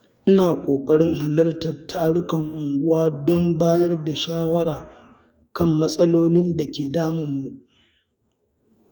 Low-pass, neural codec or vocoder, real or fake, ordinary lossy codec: 19.8 kHz; codec, 44.1 kHz, 2.6 kbps, DAC; fake; none